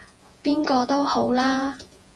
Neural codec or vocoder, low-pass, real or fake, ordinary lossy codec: vocoder, 48 kHz, 128 mel bands, Vocos; 10.8 kHz; fake; Opus, 32 kbps